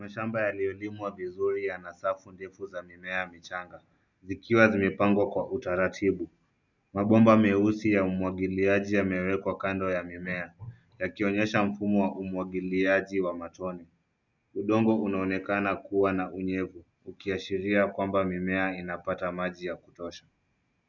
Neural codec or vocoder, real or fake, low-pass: none; real; 7.2 kHz